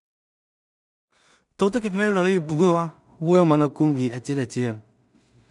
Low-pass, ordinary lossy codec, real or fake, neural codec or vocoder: 10.8 kHz; none; fake; codec, 16 kHz in and 24 kHz out, 0.4 kbps, LongCat-Audio-Codec, two codebook decoder